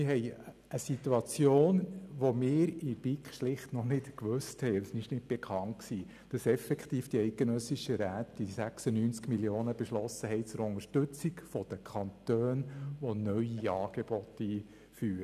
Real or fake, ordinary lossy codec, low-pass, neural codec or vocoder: real; none; 14.4 kHz; none